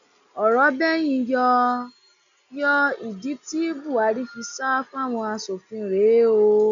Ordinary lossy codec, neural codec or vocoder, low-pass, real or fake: none; none; 7.2 kHz; real